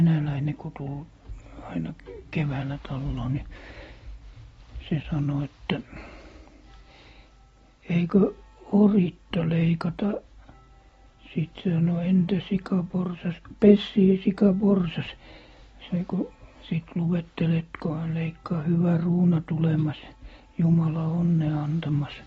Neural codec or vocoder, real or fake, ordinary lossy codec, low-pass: none; real; AAC, 24 kbps; 10.8 kHz